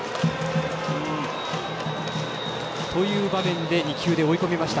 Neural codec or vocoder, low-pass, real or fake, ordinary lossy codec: none; none; real; none